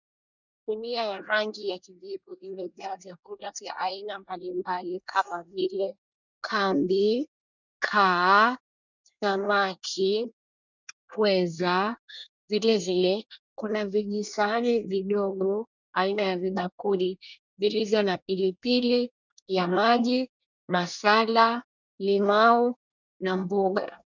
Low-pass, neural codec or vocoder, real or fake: 7.2 kHz; codec, 24 kHz, 1 kbps, SNAC; fake